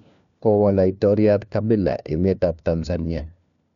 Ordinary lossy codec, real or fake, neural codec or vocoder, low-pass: none; fake; codec, 16 kHz, 1 kbps, FunCodec, trained on LibriTTS, 50 frames a second; 7.2 kHz